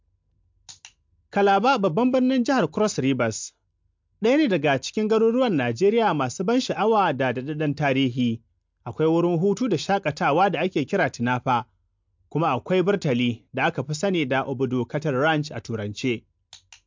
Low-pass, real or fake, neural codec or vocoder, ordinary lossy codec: 7.2 kHz; real; none; MP3, 64 kbps